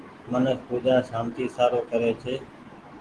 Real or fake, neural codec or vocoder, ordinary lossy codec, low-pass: real; none; Opus, 16 kbps; 10.8 kHz